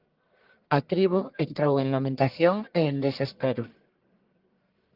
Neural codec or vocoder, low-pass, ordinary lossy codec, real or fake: codec, 44.1 kHz, 1.7 kbps, Pupu-Codec; 5.4 kHz; Opus, 32 kbps; fake